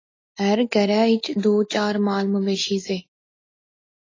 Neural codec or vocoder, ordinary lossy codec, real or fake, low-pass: none; AAC, 32 kbps; real; 7.2 kHz